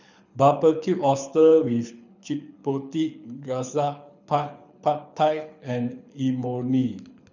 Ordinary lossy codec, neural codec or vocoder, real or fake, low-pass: none; codec, 24 kHz, 6 kbps, HILCodec; fake; 7.2 kHz